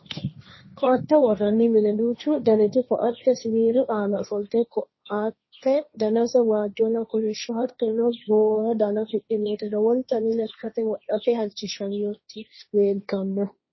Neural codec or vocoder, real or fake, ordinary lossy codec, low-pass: codec, 16 kHz, 1.1 kbps, Voila-Tokenizer; fake; MP3, 24 kbps; 7.2 kHz